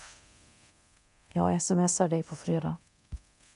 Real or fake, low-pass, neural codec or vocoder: fake; 10.8 kHz; codec, 24 kHz, 0.9 kbps, DualCodec